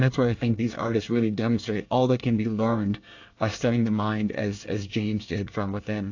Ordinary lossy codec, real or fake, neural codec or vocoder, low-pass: AAC, 48 kbps; fake; codec, 24 kHz, 1 kbps, SNAC; 7.2 kHz